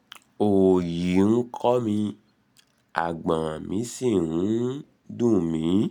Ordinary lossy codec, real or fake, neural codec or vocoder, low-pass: none; real; none; 19.8 kHz